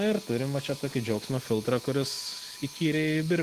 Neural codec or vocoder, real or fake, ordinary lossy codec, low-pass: none; real; Opus, 16 kbps; 14.4 kHz